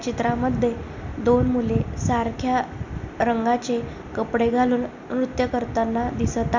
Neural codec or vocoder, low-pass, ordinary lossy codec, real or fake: none; 7.2 kHz; none; real